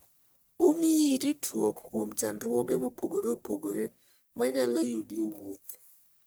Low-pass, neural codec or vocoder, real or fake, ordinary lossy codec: none; codec, 44.1 kHz, 1.7 kbps, Pupu-Codec; fake; none